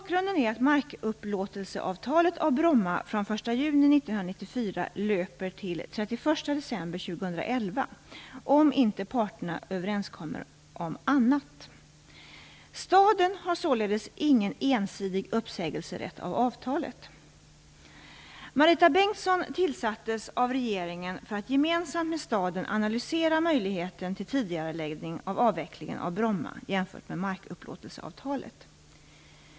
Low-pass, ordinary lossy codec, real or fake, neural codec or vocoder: none; none; real; none